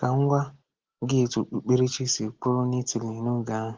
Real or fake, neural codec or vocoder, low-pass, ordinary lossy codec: real; none; 7.2 kHz; Opus, 16 kbps